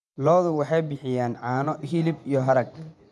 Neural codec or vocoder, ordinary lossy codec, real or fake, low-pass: vocoder, 24 kHz, 100 mel bands, Vocos; none; fake; none